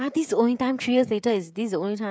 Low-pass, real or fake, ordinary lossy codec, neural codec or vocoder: none; fake; none; codec, 16 kHz, 16 kbps, FreqCodec, smaller model